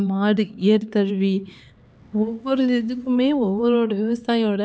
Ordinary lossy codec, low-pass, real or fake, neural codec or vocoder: none; none; fake; codec, 16 kHz, 4 kbps, X-Codec, HuBERT features, trained on LibriSpeech